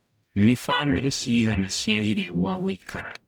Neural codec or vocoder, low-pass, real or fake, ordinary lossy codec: codec, 44.1 kHz, 0.9 kbps, DAC; none; fake; none